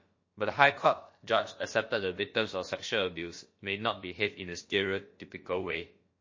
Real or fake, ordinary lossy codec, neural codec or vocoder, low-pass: fake; MP3, 32 kbps; codec, 16 kHz, about 1 kbps, DyCAST, with the encoder's durations; 7.2 kHz